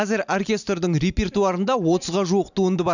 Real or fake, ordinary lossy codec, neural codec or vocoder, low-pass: real; none; none; 7.2 kHz